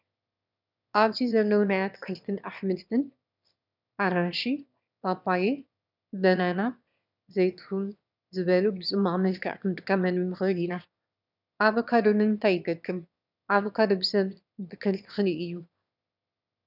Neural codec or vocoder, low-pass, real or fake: autoencoder, 22.05 kHz, a latent of 192 numbers a frame, VITS, trained on one speaker; 5.4 kHz; fake